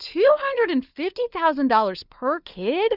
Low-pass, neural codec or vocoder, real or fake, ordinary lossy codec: 5.4 kHz; codec, 16 kHz, 4 kbps, FunCodec, trained on LibriTTS, 50 frames a second; fake; Opus, 64 kbps